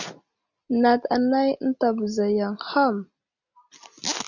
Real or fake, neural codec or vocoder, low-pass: real; none; 7.2 kHz